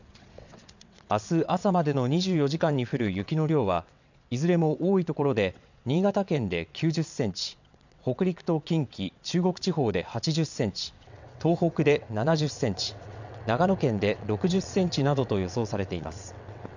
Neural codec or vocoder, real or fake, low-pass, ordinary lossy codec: vocoder, 22.05 kHz, 80 mel bands, WaveNeXt; fake; 7.2 kHz; none